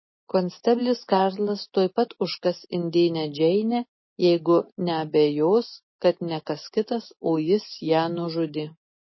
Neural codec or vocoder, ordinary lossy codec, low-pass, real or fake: none; MP3, 24 kbps; 7.2 kHz; real